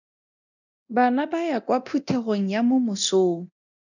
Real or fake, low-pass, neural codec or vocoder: fake; 7.2 kHz; codec, 24 kHz, 0.9 kbps, DualCodec